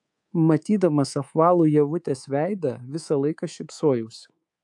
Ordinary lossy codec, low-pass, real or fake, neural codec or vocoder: AAC, 64 kbps; 10.8 kHz; fake; codec, 24 kHz, 3.1 kbps, DualCodec